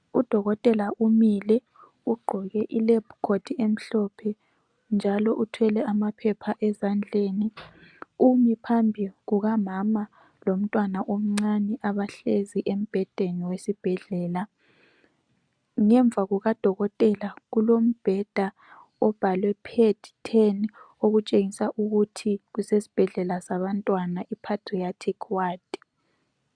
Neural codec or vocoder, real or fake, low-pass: none; real; 9.9 kHz